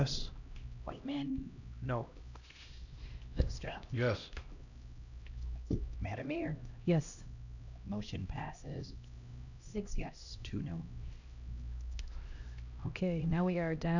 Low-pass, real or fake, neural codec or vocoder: 7.2 kHz; fake; codec, 16 kHz, 1 kbps, X-Codec, HuBERT features, trained on LibriSpeech